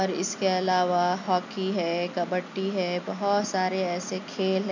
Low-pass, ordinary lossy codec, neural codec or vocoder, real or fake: 7.2 kHz; none; none; real